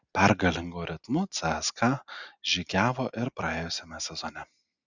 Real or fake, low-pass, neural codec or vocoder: real; 7.2 kHz; none